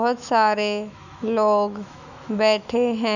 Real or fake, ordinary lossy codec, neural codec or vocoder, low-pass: fake; none; autoencoder, 48 kHz, 128 numbers a frame, DAC-VAE, trained on Japanese speech; 7.2 kHz